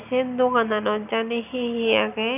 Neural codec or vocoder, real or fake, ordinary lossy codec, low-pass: none; real; none; 3.6 kHz